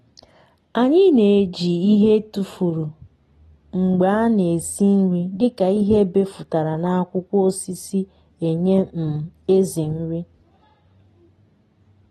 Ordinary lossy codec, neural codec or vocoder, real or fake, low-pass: AAC, 32 kbps; none; real; 19.8 kHz